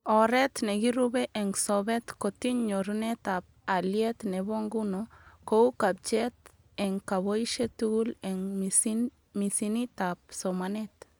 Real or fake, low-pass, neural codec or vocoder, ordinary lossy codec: real; none; none; none